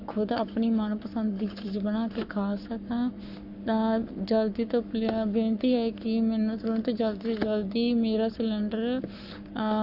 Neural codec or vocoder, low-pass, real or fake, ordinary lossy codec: codec, 44.1 kHz, 7.8 kbps, Pupu-Codec; 5.4 kHz; fake; none